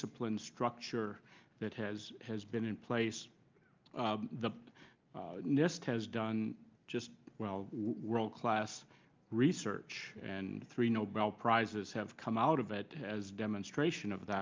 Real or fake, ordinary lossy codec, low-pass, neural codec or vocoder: real; Opus, 24 kbps; 7.2 kHz; none